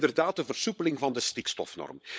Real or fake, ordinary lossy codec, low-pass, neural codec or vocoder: fake; none; none; codec, 16 kHz, 16 kbps, FunCodec, trained on LibriTTS, 50 frames a second